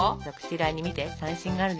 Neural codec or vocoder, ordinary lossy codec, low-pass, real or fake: none; none; none; real